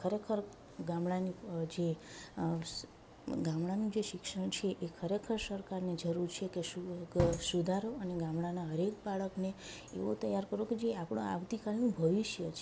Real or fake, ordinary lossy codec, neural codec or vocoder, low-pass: real; none; none; none